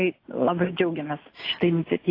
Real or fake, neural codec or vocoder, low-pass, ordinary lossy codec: real; none; 5.4 kHz; AAC, 24 kbps